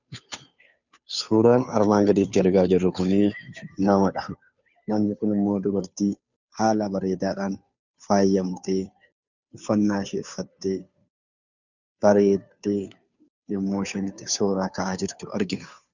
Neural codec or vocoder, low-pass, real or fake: codec, 16 kHz, 2 kbps, FunCodec, trained on Chinese and English, 25 frames a second; 7.2 kHz; fake